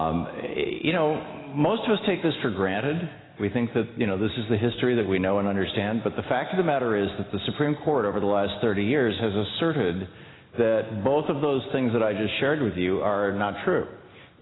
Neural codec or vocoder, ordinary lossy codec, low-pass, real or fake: none; AAC, 16 kbps; 7.2 kHz; real